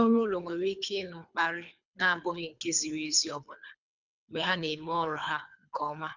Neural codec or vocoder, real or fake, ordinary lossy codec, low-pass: codec, 24 kHz, 3 kbps, HILCodec; fake; none; 7.2 kHz